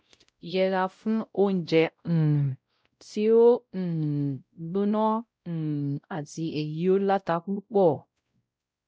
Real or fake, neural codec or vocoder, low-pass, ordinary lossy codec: fake; codec, 16 kHz, 0.5 kbps, X-Codec, WavLM features, trained on Multilingual LibriSpeech; none; none